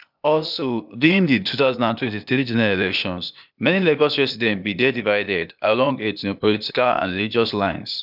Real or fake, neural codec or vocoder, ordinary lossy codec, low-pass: fake; codec, 16 kHz, 0.8 kbps, ZipCodec; none; 5.4 kHz